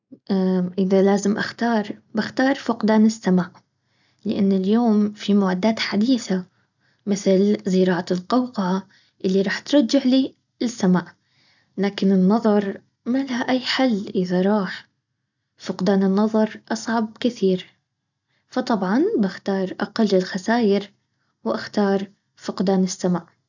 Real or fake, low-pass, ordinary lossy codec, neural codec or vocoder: real; 7.2 kHz; none; none